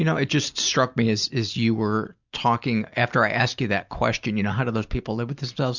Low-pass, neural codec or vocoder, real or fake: 7.2 kHz; none; real